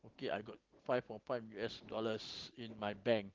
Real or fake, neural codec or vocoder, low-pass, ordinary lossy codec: real; none; 7.2 kHz; Opus, 16 kbps